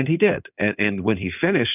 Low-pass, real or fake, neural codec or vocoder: 3.6 kHz; fake; codec, 16 kHz in and 24 kHz out, 2.2 kbps, FireRedTTS-2 codec